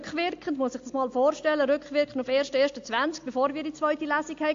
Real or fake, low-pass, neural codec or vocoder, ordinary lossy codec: real; 7.2 kHz; none; AAC, 48 kbps